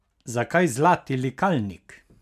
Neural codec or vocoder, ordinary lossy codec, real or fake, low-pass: none; MP3, 96 kbps; real; 14.4 kHz